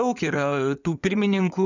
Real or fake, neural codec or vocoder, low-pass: fake; codec, 16 kHz in and 24 kHz out, 2.2 kbps, FireRedTTS-2 codec; 7.2 kHz